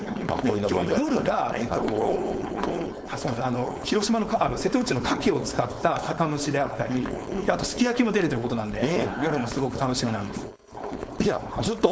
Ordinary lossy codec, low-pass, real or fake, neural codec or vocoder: none; none; fake; codec, 16 kHz, 4.8 kbps, FACodec